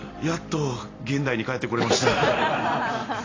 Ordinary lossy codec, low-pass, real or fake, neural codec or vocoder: AAC, 32 kbps; 7.2 kHz; real; none